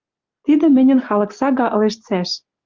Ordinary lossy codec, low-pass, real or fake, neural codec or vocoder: Opus, 32 kbps; 7.2 kHz; real; none